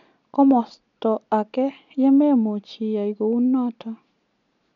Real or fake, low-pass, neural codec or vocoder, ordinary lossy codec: real; 7.2 kHz; none; none